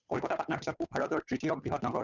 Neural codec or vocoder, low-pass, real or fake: codec, 16 kHz, 8 kbps, FunCodec, trained on Chinese and English, 25 frames a second; 7.2 kHz; fake